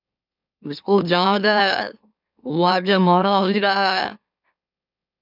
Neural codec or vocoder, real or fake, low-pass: autoencoder, 44.1 kHz, a latent of 192 numbers a frame, MeloTTS; fake; 5.4 kHz